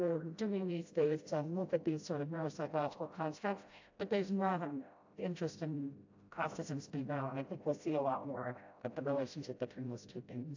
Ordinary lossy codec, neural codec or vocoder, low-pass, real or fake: AAC, 48 kbps; codec, 16 kHz, 0.5 kbps, FreqCodec, smaller model; 7.2 kHz; fake